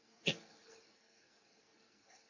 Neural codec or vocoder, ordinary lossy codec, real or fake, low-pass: codec, 44.1 kHz, 2.6 kbps, SNAC; AAC, 48 kbps; fake; 7.2 kHz